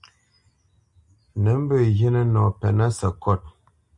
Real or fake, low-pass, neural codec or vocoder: real; 10.8 kHz; none